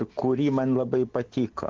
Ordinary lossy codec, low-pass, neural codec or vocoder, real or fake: Opus, 16 kbps; 7.2 kHz; none; real